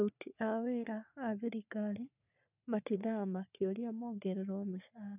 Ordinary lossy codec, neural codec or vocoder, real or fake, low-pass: none; codec, 44.1 kHz, 3.4 kbps, Pupu-Codec; fake; 3.6 kHz